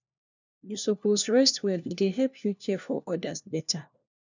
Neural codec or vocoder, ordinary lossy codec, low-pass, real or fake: codec, 16 kHz, 1 kbps, FunCodec, trained on LibriTTS, 50 frames a second; none; 7.2 kHz; fake